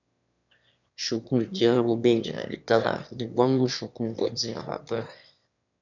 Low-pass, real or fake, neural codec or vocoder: 7.2 kHz; fake; autoencoder, 22.05 kHz, a latent of 192 numbers a frame, VITS, trained on one speaker